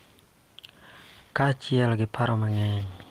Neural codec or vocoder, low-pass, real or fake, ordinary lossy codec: none; 14.4 kHz; real; Opus, 24 kbps